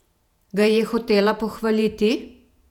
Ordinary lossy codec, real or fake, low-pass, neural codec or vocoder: none; fake; 19.8 kHz; vocoder, 48 kHz, 128 mel bands, Vocos